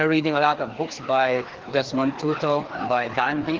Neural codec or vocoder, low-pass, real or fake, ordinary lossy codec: codec, 16 kHz, 2 kbps, FreqCodec, larger model; 7.2 kHz; fake; Opus, 16 kbps